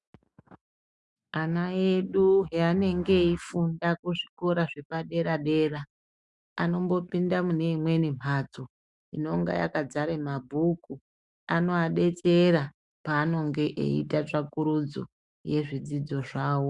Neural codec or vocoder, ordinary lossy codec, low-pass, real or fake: autoencoder, 48 kHz, 128 numbers a frame, DAC-VAE, trained on Japanese speech; Opus, 64 kbps; 10.8 kHz; fake